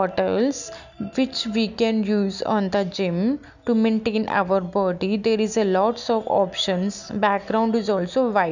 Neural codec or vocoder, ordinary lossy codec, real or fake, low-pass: none; none; real; 7.2 kHz